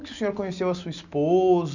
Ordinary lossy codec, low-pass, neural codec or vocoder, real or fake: none; 7.2 kHz; none; real